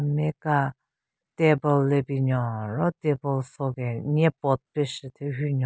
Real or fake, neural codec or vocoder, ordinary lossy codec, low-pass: real; none; none; none